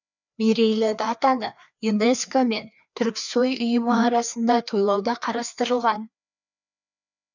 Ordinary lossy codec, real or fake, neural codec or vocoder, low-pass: none; fake; codec, 16 kHz, 2 kbps, FreqCodec, larger model; 7.2 kHz